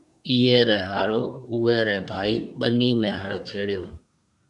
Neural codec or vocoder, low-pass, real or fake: codec, 24 kHz, 1 kbps, SNAC; 10.8 kHz; fake